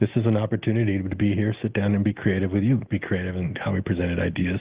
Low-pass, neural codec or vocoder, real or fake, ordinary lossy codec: 3.6 kHz; none; real; Opus, 16 kbps